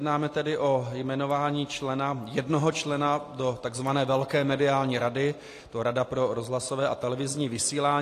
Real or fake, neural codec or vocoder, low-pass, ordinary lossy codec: real; none; 14.4 kHz; AAC, 48 kbps